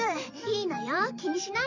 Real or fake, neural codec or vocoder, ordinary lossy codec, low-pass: fake; vocoder, 44.1 kHz, 128 mel bands every 512 samples, BigVGAN v2; none; 7.2 kHz